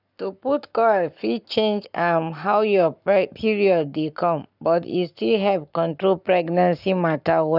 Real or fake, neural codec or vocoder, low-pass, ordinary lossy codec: fake; codec, 44.1 kHz, 7.8 kbps, Pupu-Codec; 5.4 kHz; none